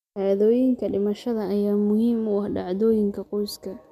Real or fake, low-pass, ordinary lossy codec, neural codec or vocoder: real; 14.4 kHz; MP3, 96 kbps; none